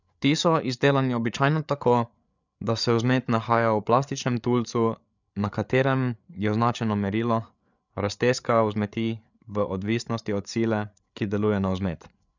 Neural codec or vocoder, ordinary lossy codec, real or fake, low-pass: codec, 16 kHz, 8 kbps, FreqCodec, larger model; none; fake; 7.2 kHz